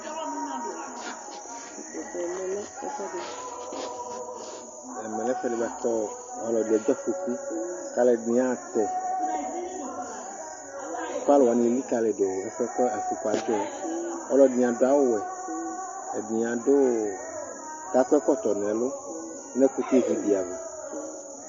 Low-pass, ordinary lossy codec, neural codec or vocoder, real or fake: 7.2 kHz; MP3, 32 kbps; none; real